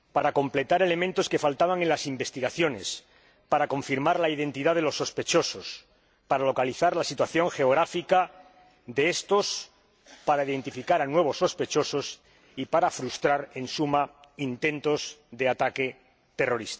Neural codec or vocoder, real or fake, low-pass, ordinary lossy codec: none; real; none; none